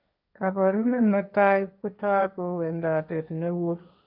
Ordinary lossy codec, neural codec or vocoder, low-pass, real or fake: none; codec, 16 kHz, 1.1 kbps, Voila-Tokenizer; 5.4 kHz; fake